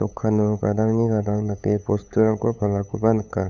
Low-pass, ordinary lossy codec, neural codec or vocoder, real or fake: 7.2 kHz; none; codec, 16 kHz, 8 kbps, FunCodec, trained on LibriTTS, 25 frames a second; fake